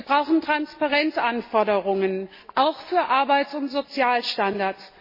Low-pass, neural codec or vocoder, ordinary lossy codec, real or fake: 5.4 kHz; none; MP3, 24 kbps; real